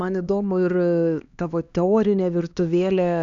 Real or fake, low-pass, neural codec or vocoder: fake; 7.2 kHz; codec, 16 kHz, 2 kbps, X-Codec, HuBERT features, trained on LibriSpeech